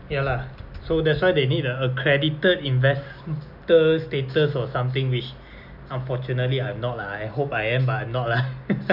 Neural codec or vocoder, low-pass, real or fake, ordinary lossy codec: none; 5.4 kHz; real; none